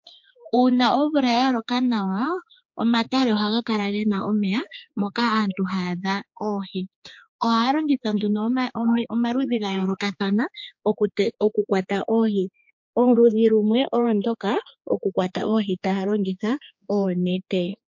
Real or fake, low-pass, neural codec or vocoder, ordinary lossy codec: fake; 7.2 kHz; codec, 16 kHz, 4 kbps, X-Codec, HuBERT features, trained on general audio; MP3, 48 kbps